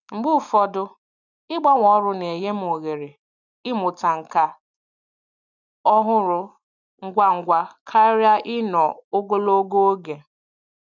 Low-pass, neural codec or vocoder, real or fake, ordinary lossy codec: 7.2 kHz; none; real; none